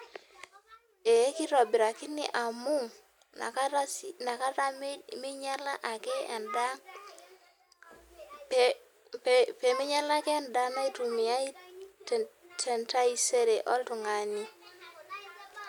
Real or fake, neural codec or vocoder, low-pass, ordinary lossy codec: real; none; 19.8 kHz; none